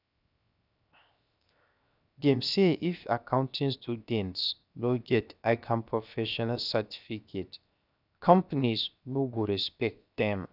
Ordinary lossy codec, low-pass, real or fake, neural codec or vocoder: none; 5.4 kHz; fake; codec, 16 kHz, 0.7 kbps, FocalCodec